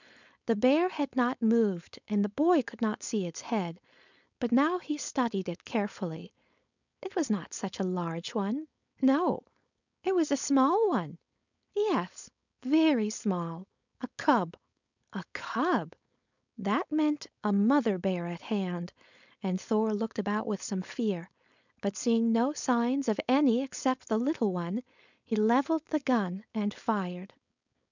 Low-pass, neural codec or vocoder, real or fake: 7.2 kHz; codec, 16 kHz, 4.8 kbps, FACodec; fake